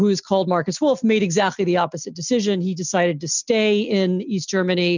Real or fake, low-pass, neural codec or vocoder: real; 7.2 kHz; none